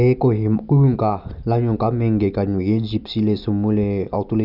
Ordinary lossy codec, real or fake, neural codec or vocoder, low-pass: none; real; none; 5.4 kHz